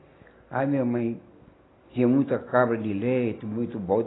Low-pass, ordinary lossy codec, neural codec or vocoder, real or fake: 7.2 kHz; AAC, 16 kbps; none; real